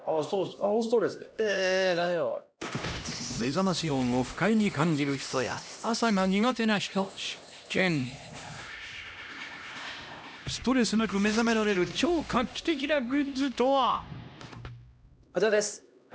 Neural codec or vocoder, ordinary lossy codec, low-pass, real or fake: codec, 16 kHz, 1 kbps, X-Codec, HuBERT features, trained on LibriSpeech; none; none; fake